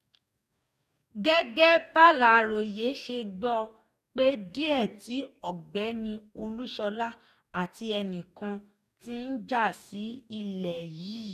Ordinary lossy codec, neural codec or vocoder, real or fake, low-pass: none; codec, 44.1 kHz, 2.6 kbps, DAC; fake; 14.4 kHz